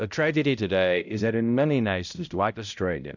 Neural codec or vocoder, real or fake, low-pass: codec, 16 kHz, 0.5 kbps, X-Codec, HuBERT features, trained on balanced general audio; fake; 7.2 kHz